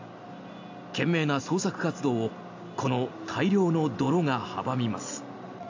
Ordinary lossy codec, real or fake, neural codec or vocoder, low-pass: AAC, 48 kbps; real; none; 7.2 kHz